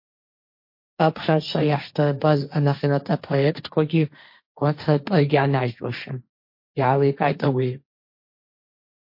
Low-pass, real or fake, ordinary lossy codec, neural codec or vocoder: 5.4 kHz; fake; MP3, 32 kbps; codec, 16 kHz, 1.1 kbps, Voila-Tokenizer